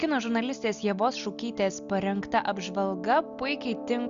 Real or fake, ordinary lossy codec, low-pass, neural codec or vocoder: real; Opus, 64 kbps; 7.2 kHz; none